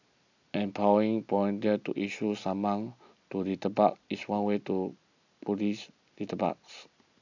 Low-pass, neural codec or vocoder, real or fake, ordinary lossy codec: 7.2 kHz; none; real; AAC, 48 kbps